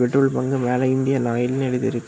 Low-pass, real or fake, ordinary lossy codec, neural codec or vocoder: none; real; none; none